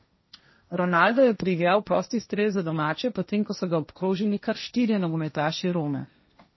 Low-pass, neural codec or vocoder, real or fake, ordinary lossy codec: 7.2 kHz; codec, 16 kHz, 1.1 kbps, Voila-Tokenizer; fake; MP3, 24 kbps